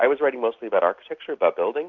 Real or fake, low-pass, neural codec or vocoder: real; 7.2 kHz; none